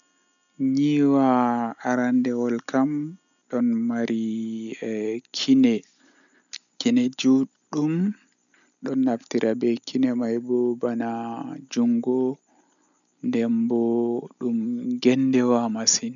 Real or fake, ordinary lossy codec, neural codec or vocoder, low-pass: real; none; none; 7.2 kHz